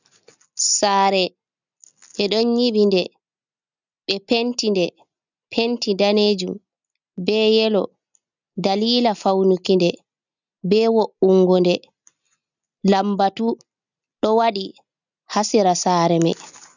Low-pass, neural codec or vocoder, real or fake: 7.2 kHz; none; real